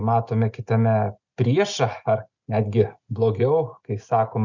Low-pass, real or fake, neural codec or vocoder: 7.2 kHz; real; none